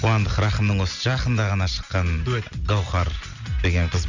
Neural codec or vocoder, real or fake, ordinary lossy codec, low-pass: none; real; Opus, 64 kbps; 7.2 kHz